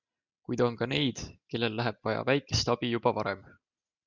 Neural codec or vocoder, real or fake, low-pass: none; real; 7.2 kHz